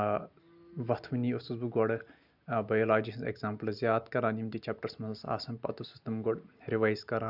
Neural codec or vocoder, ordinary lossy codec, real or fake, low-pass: none; none; real; 5.4 kHz